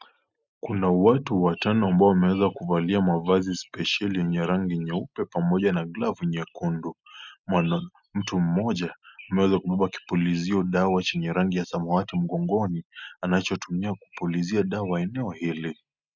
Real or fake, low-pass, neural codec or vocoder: real; 7.2 kHz; none